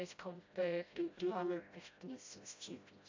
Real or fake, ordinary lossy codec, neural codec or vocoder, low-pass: fake; MP3, 64 kbps; codec, 16 kHz, 0.5 kbps, FreqCodec, smaller model; 7.2 kHz